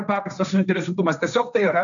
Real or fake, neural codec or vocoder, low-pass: fake; codec, 16 kHz, 1.1 kbps, Voila-Tokenizer; 7.2 kHz